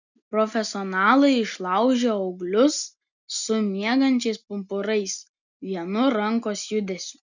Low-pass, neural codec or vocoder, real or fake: 7.2 kHz; none; real